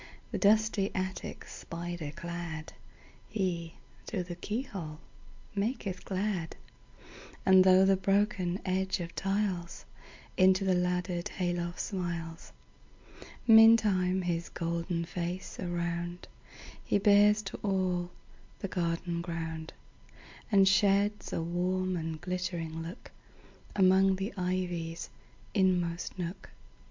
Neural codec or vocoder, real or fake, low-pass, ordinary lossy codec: none; real; 7.2 kHz; MP3, 64 kbps